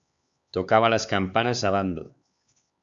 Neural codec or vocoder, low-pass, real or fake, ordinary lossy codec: codec, 16 kHz, 4 kbps, X-Codec, HuBERT features, trained on LibriSpeech; 7.2 kHz; fake; Opus, 64 kbps